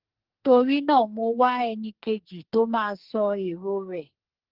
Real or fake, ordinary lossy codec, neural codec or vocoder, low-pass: fake; Opus, 16 kbps; codec, 44.1 kHz, 2.6 kbps, SNAC; 5.4 kHz